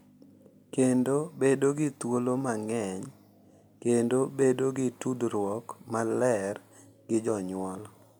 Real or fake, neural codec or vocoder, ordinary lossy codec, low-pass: real; none; none; none